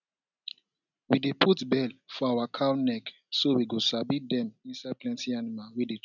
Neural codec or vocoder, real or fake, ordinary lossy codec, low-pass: none; real; none; 7.2 kHz